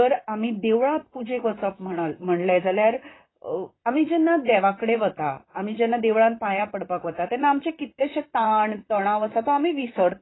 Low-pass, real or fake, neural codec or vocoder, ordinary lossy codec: 7.2 kHz; fake; vocoder, 44.1 kHz, 128 mel bands, Pupu-Vocoder; AAC, 16 kbps